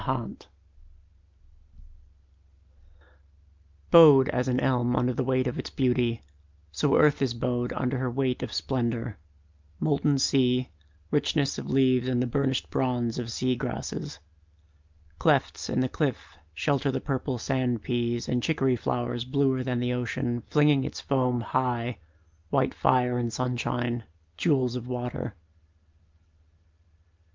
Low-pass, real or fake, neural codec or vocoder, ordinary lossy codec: 7.2 kHz; real; none; Opus, 24 kbps